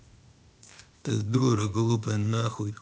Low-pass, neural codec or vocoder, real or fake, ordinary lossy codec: none; codec, 16 kHz, 0.8 kbps, ZipCodec; fake; none